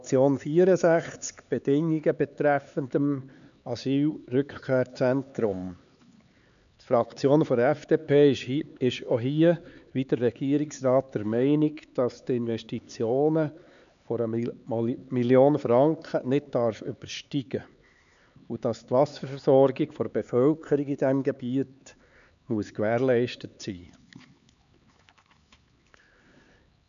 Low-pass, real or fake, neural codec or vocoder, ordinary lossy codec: 7.2 kHz; fake; codec, 16 kHz, 4 kbps, X-Codec, HuBERT features, trained on LibriSpeech; none